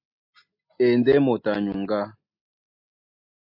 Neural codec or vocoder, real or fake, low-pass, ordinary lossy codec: none; real; 5.4 kHz; MP3, 32 kbps